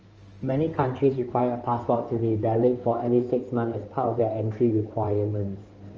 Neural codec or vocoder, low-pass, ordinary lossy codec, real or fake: codec, 16 kHz in and 24 kHz out, 2.2 kbps, FireRedTTS-2 codec; 7.2 kHz; Opus, 24 kbps; fake